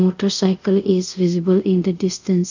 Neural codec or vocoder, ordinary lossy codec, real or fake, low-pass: codec, 24 kHz, 0.5 kbps, DualCodec; none; fake; 7.2 kHz